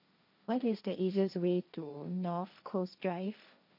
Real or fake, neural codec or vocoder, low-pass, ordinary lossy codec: fake; codec, 16 kHz, 1.1 kbps, Voila-Tokenizer; 5.4 kHz; none